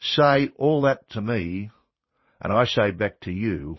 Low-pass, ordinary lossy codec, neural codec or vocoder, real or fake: 7.2 kHz; MP3, 24 kbps; vocoder, 44.1 kHz, 80 mel bands, Vocos; fake